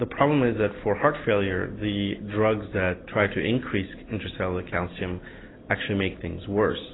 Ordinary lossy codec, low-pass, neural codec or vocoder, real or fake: AAC, 16 kbps; 7.2 kHz; none; real